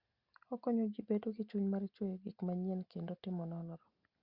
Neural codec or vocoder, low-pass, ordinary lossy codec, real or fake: none; 5.4 kHz; Opus, 64 kbps; real